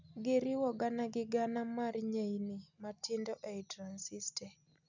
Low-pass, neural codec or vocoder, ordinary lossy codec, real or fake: 7.2 kHz; none; none; real